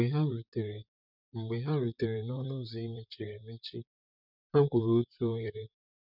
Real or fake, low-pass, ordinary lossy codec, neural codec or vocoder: fake; 5.4 kHz; none; vocoder, 44.1 kHz, 128 mel bands, Pupu-Vocoder